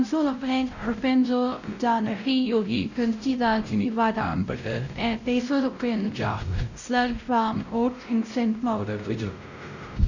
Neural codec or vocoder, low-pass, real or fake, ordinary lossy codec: codec, 16 kHz, 0.5 kbps, X-Codec, WavLM features, trained on Multilingual LibriSpeech; 7.2 kHz; fake; none